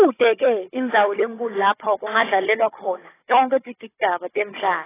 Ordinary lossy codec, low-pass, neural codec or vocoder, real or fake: AAC, 16 kbps; 3.6 kHz; codec, 16 kHz, 16 kbps, FunCodec, trained on Chinese and English, 50 frames a second; fake